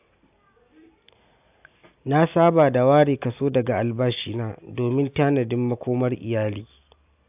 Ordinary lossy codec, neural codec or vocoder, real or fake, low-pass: AAC, 32 kbps; none; real; 3.6 kHz